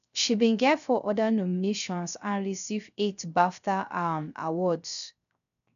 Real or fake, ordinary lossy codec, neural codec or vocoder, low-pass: fake; none; codec, 16 kHz, 0.3 kbps, FocalCodec; 7.2 kHz